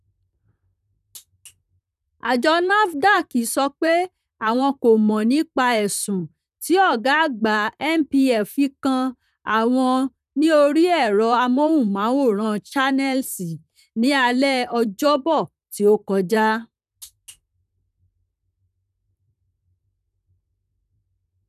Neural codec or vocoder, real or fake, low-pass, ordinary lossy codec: vocoder, 44.1 kHz, 128 mel bands, Pupu-Vocoder; fake; 14.4 kHz; none